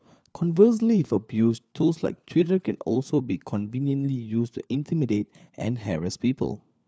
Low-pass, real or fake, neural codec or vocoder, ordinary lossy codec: none; fake; codec, 16 kHz, 4 kbps, FunCodec, trained on LibriTTS, 50 frames a second; none